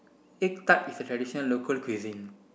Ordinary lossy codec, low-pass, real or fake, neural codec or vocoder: none; none; real; none